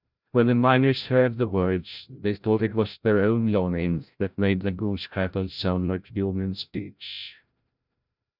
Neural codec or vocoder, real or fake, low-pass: codec, 16 kHz, 0.5 kbps, FreqCodec, larger model; fake; 5.4 kHz